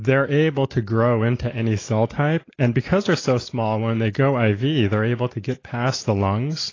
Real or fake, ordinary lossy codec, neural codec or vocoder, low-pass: real; AAC, 32 kbps; none; 7.2 kHz